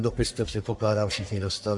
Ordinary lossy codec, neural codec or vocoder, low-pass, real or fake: AAC, 64 kbps; codec, 44.1 kHz, 1.7 kbps, Pupu-Codec; 10.8 kHz; fake